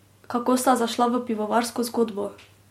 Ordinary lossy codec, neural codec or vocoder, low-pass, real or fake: MP3, 64 kbps; none; 19.8 kHz; real